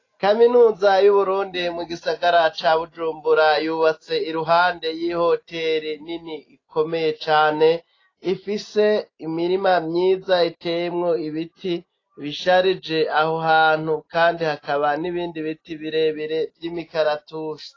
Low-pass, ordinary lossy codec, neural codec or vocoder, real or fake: 7.2 kHz; AAC, 32 kbps; none; real